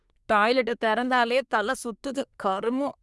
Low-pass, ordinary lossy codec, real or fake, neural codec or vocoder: none; none; fake; codec, 24 kHz, 1 kbps, SNAC